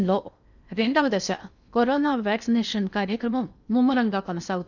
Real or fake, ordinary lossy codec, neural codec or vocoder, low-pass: fake; none; codec, 16 kHz in and 24 kHz out, 0.8 kbps, FocalCodec, streaming, 65536 codes; 7.2 kHz